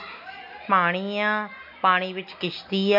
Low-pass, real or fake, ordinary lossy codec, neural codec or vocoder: 5.4 kHz; real; MP3, 48 kbps; none